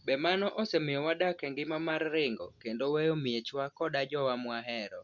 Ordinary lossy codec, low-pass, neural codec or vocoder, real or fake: Opus, 64 kbps; 7.2 kHz; none; real